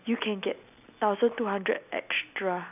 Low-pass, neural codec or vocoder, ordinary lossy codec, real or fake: 3.6 kHz; none; none; real